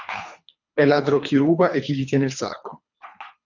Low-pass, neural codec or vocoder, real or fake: 7.2 kHz; codec, 24 kHz, 3 kbps, HILCodec; fake